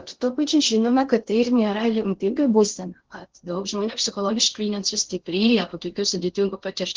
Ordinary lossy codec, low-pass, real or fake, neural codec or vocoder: Opus, 16 kbps; 7.2 kHz; fake; codec, 16 kHz in and 24 kHz out, 0.6 kbps, FocalCodec, streaming, 2048 codes